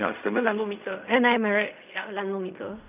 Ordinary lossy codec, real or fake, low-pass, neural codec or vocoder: none; fake; 3.6 kHz; codec, 16 kHz in and 24 kHz out, 0.4 kbps, LongCat-Audio-Codec, fine tuned four codebook decoder